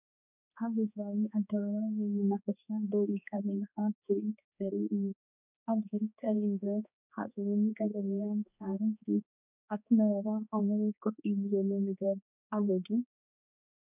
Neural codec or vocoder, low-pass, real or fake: codec, 16 kHz, 2 kbps, X-Codec, HuBERT features, trained on balanced general audio; 3.6 kHz; fake